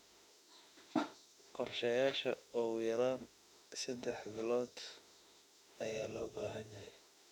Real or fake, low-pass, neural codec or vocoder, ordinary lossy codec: fake; 19.8 kHz; autoencoder, 48 kHz, 32 numbers a frame, DAC-VAE, trained on Japanese speech; none